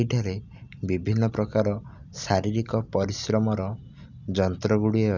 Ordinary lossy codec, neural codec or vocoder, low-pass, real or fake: none; none; 7.2 kHz; real